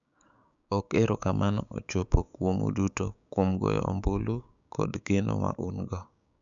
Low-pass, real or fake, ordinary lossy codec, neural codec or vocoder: 7.2 kHz; fake; none; codec, 16 kHz, 6 kbps, DAC